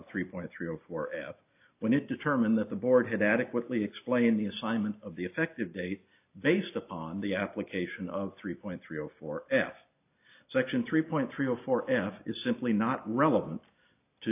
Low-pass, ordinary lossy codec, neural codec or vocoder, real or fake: 3.6 kHz; AAC, 32 kbps; none; real